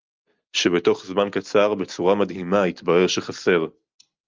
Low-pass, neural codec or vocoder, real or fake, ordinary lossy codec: 7.2 kHz; none; real; Opus, 24 kbps